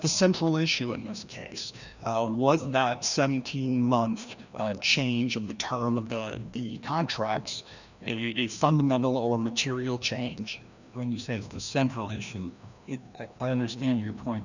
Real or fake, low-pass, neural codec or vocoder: fake; 7.2 kHz; codec, 16 kHz, 1 kbps, FreqCodec, larger model